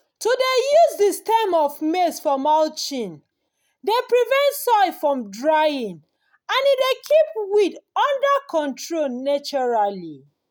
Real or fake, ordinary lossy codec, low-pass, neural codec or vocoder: real; none; none; none